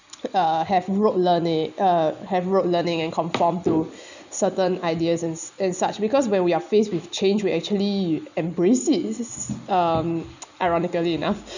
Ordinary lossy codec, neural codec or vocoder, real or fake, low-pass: none; none; real; 7.2 kHz